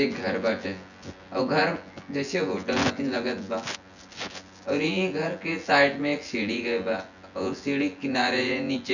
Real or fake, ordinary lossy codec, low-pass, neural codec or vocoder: fake; none; 7.2 kHz; vocoder, 24 kHz, 100 mel bands, Vocos